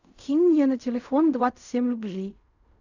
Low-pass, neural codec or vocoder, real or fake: 7.2 kHz; codec, 16 kHz in and 24 kHz out, 0.4 kbps, LongCat-Audio-Codec, fine tuned four codebook decoder; fake